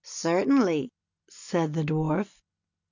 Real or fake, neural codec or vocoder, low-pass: real; none; 7.2 kHz